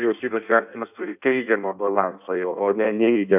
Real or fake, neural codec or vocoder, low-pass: fake; codec, 16 kHz in and 24 kHz out, 0.6 kbps, FireRedTTS-2 codec; 3.6 kHz